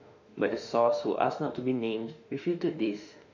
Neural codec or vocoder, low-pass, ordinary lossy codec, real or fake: autoencoder, 48 kHz, 32 numbers a frame, DAC-VAE, trained on Japanese speech; 7.2 kHz; none; fake